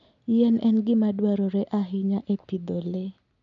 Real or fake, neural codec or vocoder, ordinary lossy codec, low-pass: real; none; none; 7.2 kHz